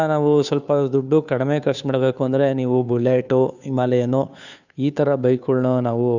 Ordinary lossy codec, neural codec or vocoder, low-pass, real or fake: none; codec, 16 kHz, 2 kbps, FunCodec, trained on Chinese and English, 25 frames a second; 7.2 kHz; fake